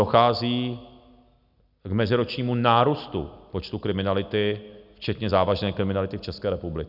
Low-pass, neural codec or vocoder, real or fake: 5.4 kHz; none; real